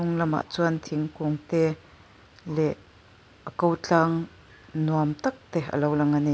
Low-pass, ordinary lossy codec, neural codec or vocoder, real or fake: none; none; none; real